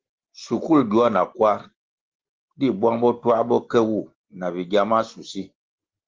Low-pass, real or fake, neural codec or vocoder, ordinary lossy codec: 7.2 kHz; real; none; Opus, 16 kbps